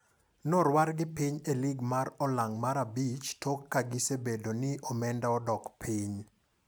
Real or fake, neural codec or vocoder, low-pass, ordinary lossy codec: real; none; none; none